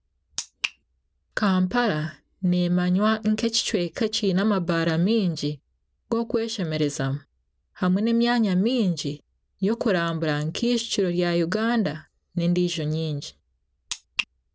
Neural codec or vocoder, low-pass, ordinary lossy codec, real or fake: none; none; none; real